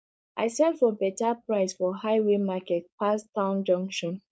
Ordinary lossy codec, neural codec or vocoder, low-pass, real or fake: none; codec, 16 kHz, 4.8 kbps, FACodec; none; fake